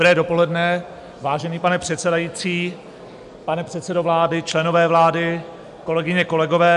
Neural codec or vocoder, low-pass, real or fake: none; 10.8 kHz; real